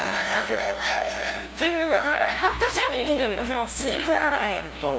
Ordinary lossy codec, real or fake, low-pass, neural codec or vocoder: none; fake; none; codec, 16 kHz, 0.5 kbps, FunCodec, trained on LibriTTS, 25 frames a second